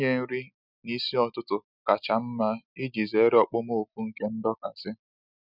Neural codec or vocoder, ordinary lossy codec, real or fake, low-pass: none; none; real; 5.4 kHz